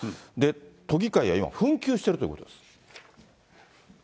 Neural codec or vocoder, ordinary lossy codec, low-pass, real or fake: none; none; none; real